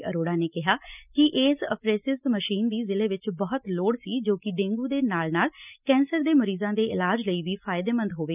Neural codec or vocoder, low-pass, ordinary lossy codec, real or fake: none; 3.6 kHz; none; real